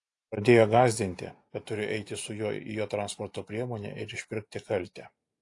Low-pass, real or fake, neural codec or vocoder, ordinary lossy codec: 10.8 kHz; real; none; AAC, 48 kbps